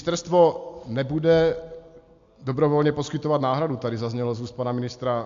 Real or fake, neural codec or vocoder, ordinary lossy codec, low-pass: real; none; AAC, 64 kbps; 7.2 kHz